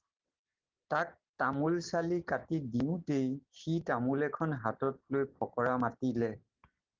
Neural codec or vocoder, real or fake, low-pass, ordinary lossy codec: none; real; 7.2 kHz; Opus, 16 kbps